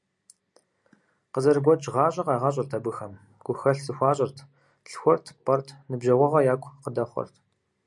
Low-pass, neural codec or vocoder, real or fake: 10.8 kHz; none; real